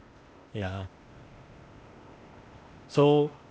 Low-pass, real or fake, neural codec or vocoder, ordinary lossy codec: none; fake; codec, 16 kHz, 0.8 kbps, ZipCodec; none